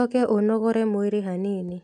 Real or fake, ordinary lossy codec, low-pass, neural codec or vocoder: real; none; none; none